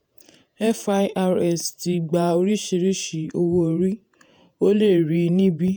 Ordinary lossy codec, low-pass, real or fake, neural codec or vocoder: none; none; fake; vocoder, 48 kHz, 128 mel bands, Vocos